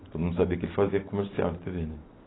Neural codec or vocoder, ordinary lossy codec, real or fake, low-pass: none; AAC, 16 kbps; real; 7.2 kHz